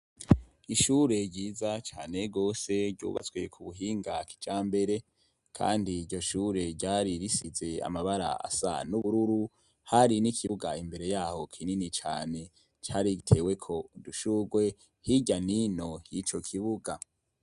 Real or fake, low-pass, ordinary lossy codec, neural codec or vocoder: real; 10.8 kHz; Opus, 64 kbps; none